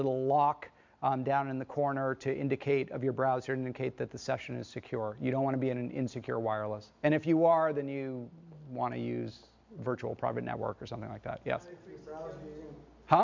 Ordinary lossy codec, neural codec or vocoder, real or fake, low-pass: MP3, 64 kbps; none; real; 7.2 kHz